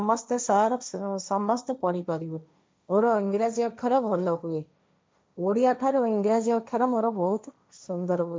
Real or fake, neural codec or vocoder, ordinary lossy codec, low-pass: fake; codec, 16 kHz, 1.1 kbps, Voila-Tokenizer; none; none